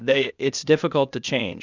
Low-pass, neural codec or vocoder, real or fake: 7.2 kHz; codec, 16 kHz, 0.8 kbps, ZipCodec; fake